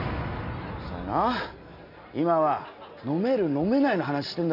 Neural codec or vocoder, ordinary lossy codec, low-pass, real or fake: none; AAC, 48 kbps; 5.4 kHz; real